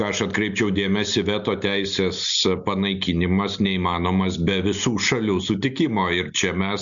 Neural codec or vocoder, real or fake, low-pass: none; real; 7.2 kHz